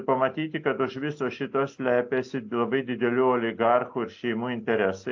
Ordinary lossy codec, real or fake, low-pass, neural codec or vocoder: AAC, 48 kbps; real; 7.2 kHz; none